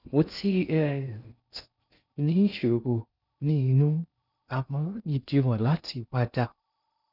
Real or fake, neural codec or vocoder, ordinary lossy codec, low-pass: fake; codec, 16 kHz in and 24 kHz out, 0.6 kbps, FocalCodec, streaming, 2048 codes; none; 5.4 kHz